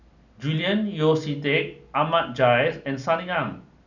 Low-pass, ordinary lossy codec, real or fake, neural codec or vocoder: 7.2 kHz; none; real; none